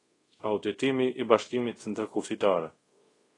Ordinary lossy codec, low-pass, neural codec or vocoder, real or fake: AAC, 32 kbps; 10.8 kHz; codec, 24 kHz, 0.5 kbps, DualCodec; fake